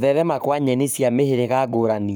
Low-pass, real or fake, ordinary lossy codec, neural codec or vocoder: none; fake; none; codec, 44.1 kHz, 7.8 kbps, Pupu-Codec